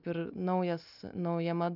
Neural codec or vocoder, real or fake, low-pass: none; real; 5.4 kHz